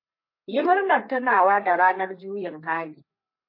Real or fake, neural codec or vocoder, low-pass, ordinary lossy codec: fake; codec, 32 kHz, 1.9 kbps, SNAC; 5.4 kHz; MP3, 32 kbps